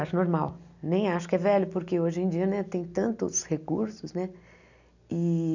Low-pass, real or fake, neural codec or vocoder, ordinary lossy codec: 7.2 kHz; real; none; none